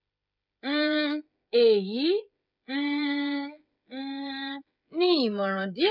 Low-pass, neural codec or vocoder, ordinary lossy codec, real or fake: 5.4 kHz; codec, 16 kHz, 16 kbps, FreqCodec, smaller model; none; fake